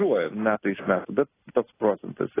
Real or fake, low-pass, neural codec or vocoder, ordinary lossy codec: real; 3.6 kHz; none; AAC, 16 kbps